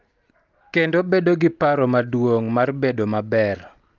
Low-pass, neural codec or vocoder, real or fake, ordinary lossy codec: 7.2 kHz; none; real; Opus, 32 kbps